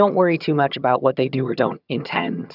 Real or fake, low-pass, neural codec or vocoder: fake; 5.4 kHz; vocoder, 22.05 kHz, 80 mel bands, HiFi-GAN